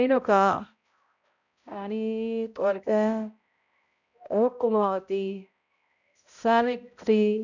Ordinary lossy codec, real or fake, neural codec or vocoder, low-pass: none; fake; codec, 16 kHz, 0.5 kbps, X-Codec, HuBERT features, trained on balanced general audio; 7.2 kHz